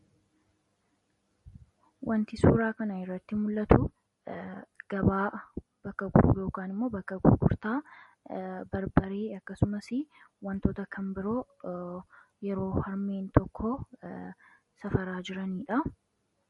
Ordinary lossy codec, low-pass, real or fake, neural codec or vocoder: MP3, 48 kbps; 19.8 kHz; real; none